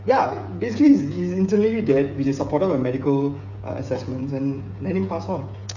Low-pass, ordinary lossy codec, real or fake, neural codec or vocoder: 7.2 kHz; none; fake; codec, 16 kHz, 8 kbps, FreqCodec, smaller model